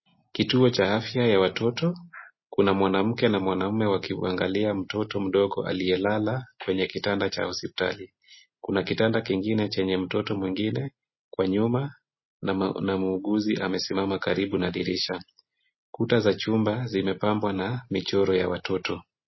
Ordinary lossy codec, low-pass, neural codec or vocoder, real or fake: MP3, 24 kbps; 7.2 kHz; none; real